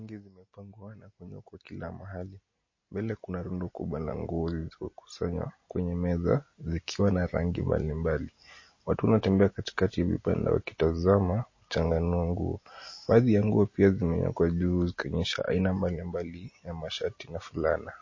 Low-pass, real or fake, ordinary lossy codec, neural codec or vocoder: 7.2 kHz; real; MP3, 32 kbps; none